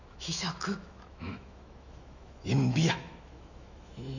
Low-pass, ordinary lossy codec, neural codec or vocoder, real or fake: 7.2 kHz; none; autoencoder, 48 kHz, 128 numbers a frame, DAC-VAE, trained on Japanese speech; fake